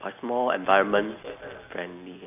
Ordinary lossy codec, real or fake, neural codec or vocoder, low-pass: none; real; none; 3.6 kHz